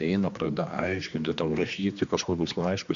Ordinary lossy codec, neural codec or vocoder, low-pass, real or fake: MP3, 64 kbps; codec, 16 kHz, 2 kbps, X-Codec, HuBERT features, trained on general audio; 7.2 kHz; fake